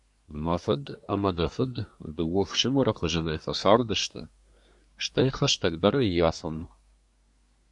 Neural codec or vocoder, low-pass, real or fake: codec, 24 kHz, 1 kbps, SNAC; 10.8 kHz; fake